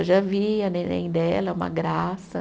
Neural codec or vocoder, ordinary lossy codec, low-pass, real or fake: none; none; none; real